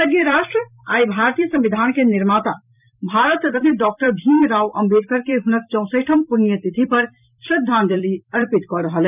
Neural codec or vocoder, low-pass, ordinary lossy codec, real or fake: vocoder, 44.1 kHz, 128 mel bands every 256 samples, BigVGAN v2; 3.6 kHz; none; fake